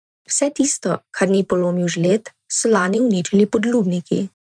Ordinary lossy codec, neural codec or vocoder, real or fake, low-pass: none; vocoder, 44.1 kHz, 128 mel bands every 512 samples, BigVGAN v2; fake; 9.9 kHz